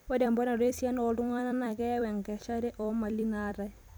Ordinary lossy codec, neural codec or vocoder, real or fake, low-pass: none; vocoder, 44.1 kHz, 128 mel bands every 256 samples, BigVGAN v2; fake; none